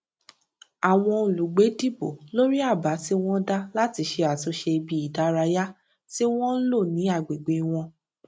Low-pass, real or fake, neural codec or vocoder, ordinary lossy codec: none; real; none; none